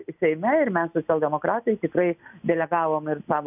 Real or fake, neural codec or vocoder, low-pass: real; none; 3.6 kHz